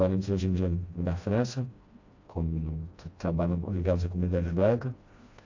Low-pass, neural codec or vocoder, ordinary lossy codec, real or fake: 7.2 kHz; codec, 16 kHz, 1 kbps, FreqCodec, smaller model; AAC, 48 kbps; fake